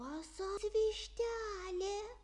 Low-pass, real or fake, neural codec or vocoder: 10.8 kHz; real; none